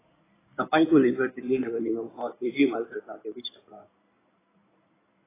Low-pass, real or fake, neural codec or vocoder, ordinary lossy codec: 3.6 kHz; fake; codec, 16 kHz in and 24 kHz out, 2.2 kbps, FireRedTTS-2 codec; AAC, 16 kbps